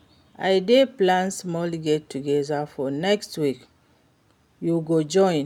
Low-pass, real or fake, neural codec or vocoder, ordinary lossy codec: 19.8 kHz; real; none; none